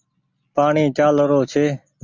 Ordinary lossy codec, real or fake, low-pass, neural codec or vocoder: Opus, 64 kbps; real; 7.2 kHz; none